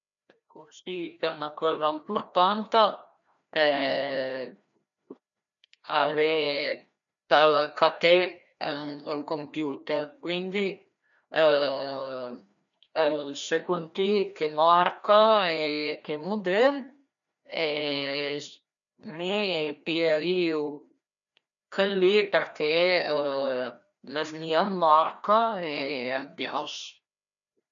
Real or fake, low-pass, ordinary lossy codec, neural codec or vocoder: fake; 7.2 kHz; none; codec, 16 kHz, 1 kbps, FreqCodec, larger model